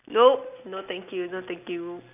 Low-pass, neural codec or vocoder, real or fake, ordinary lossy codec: 3.6 kHz; none; real; none